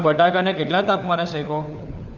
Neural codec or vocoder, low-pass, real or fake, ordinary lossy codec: codec, 16 kHz, 4 kbps, FunCodec, trained on LibriTTS, 50 frames a second; 7.2 kHz; fake; none